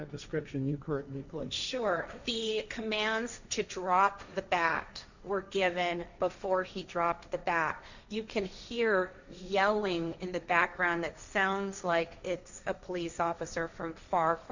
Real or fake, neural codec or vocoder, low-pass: fake; codec, 16 kHz, 1.1 kbps, Voila-Tokenizer; 7.2 kHz